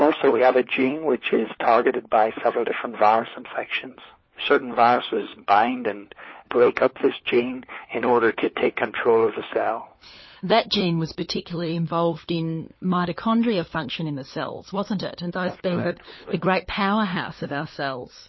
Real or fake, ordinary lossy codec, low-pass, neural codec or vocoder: fake; MP3, 24 kbps; 7.2 kHz; codec, 16 kHz, 4 kbps, FunCodec, trained on LibriTTS, 50 frames a second